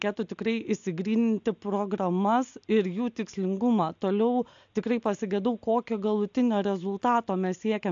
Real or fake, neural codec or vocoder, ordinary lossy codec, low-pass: real; none; AAC, 64 kbps; 7.2 kHz